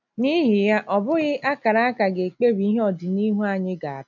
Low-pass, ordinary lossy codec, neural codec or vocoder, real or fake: 7.2 kHz; none; none; real